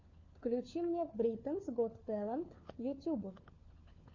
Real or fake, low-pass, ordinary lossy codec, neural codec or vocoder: fake; 7.2 kHz; AAC, 48 kbps; codec, 16 kHz, 4 kbps, FunCodec, trained on LibriTTS, 50 frames a second